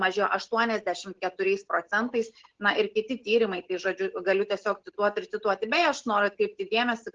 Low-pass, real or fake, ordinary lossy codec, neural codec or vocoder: 7.2 kHz; real; Opus, 16 kbps; none